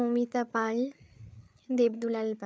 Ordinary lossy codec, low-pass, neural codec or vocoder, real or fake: none; none; codec, 16 kHz, 8 kbps, FreqCodec, larger model; fake